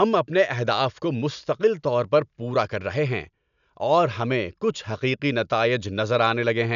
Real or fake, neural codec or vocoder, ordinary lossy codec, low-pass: real; none; none; 7.2 kHz